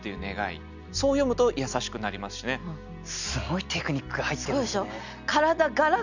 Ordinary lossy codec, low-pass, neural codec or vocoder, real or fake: none; 7.2 kHz; none; real